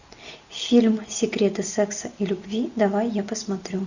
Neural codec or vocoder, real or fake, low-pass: none; real; 7.2 kHz